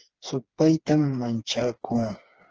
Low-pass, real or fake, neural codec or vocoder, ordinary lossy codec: 7.2 kHz; fake; codec, 16 kHz, 4 kbps, FreqCodec, smaller model; Opus, 16 kbps